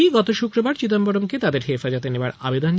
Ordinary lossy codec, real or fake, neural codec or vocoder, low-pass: none; real; none; none